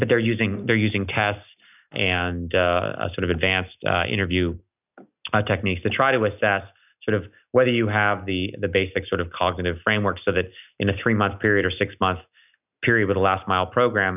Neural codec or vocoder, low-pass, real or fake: none; 3.6 kHz; real